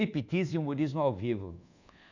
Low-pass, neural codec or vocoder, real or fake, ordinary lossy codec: 7.2 kHz; codec, 24 kHz, 1.2 kbps, DualCodec; fake; none